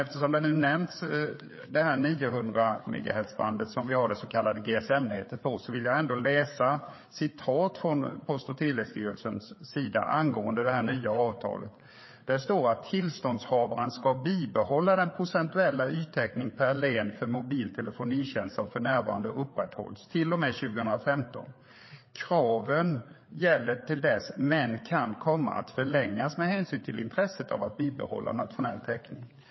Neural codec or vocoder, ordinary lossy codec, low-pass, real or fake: codec, 16 kHz, 4 kbps, FreqCodec, larger model; MP3, 24 kbps; 7.2 kHz; fake